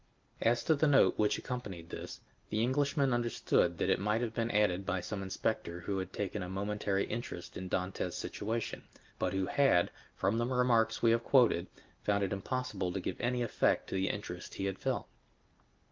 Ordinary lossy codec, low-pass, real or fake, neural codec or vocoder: Opus, 32 kbps; 7.2 kHz; real; none